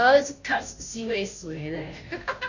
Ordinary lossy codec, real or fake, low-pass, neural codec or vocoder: none; fake; 7.2 kHz; codec, 16 kHz, 0.5 kbps, FunCodec, trained on Chinese and English, 25 frames a second